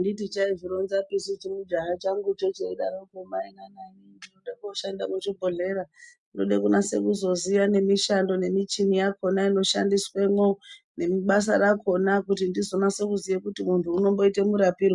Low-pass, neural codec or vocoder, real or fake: 10.8 kHz; none; real